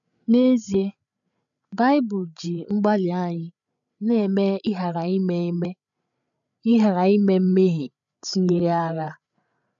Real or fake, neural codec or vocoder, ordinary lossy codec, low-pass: fake; codec, 16 kHz, 8 kbps, FreqCodec, larger model; none; 7.2 kHz